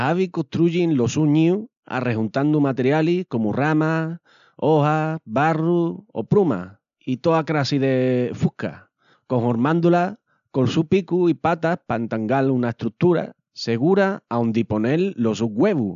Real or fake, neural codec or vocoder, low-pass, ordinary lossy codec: real; none; 7.2 kHz; none